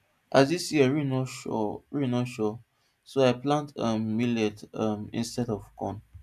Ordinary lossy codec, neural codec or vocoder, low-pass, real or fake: none; none; 14.4 kHz; real